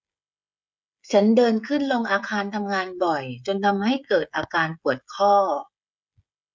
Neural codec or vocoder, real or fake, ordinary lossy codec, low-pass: codec, 16 kHz, 16 kbps, FreqCodec, smaller model; fake; none; none